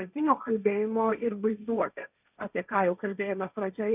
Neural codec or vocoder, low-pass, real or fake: codec, 16 kHz, 1.1 kbps, Voila-Tokenizer; 3.6 kHz; fake